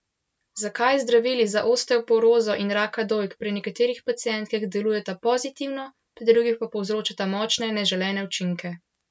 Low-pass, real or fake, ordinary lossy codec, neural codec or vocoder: none; real; none; none